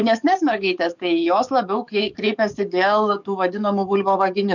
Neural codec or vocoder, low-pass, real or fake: codec, 44.1 kHz, 7.8 kbps, DAC; 7.2 kHz; fake